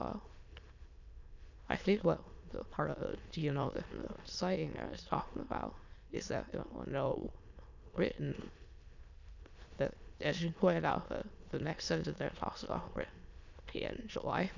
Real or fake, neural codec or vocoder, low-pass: fake; autoencoder, 22.05 kHz, a latent of 192 numbers a frame, VITS, trained on many speakers; 7.2 kHz